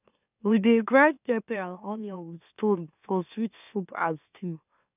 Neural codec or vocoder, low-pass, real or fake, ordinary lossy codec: autoencoder, 44.1 kHz, a latent of 192 numbers a frame, MeloTTS; 3.6 kHz; fake; none